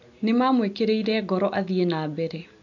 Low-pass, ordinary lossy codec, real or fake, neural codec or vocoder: 7.2 kHz; none; real; none